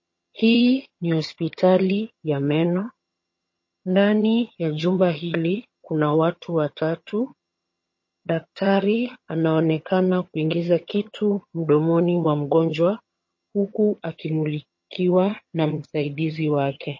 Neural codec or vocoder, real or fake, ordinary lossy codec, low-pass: vocoder, 22.05 kHz, 80 mel bands, HiFi-GAN; fake; MP3, 32 kbps; 7.2 kHz